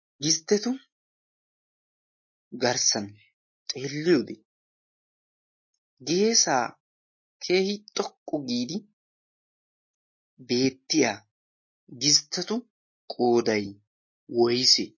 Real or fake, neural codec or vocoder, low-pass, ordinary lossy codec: real; none; 7.2 kHz; MP3, 32 kbps